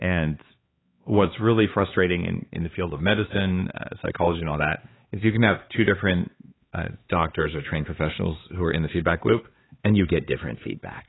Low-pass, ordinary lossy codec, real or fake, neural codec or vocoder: 7.2 kHz; AAC, 16 kbps; fake; codec, 16 kHz, 4 kbps, X-Codec, HuBERT features, trained on LibriSpeech